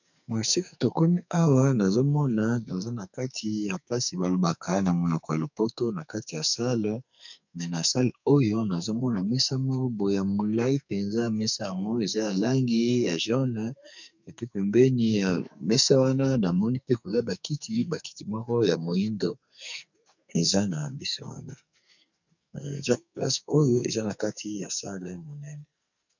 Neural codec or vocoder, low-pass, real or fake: codec, 44.1 kHz, 2.6 kbps, SNAC; 7.2 kHz; fake